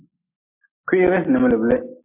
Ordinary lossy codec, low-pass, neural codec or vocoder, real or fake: MP3, 24 kbps; 3.6 kHz; none; real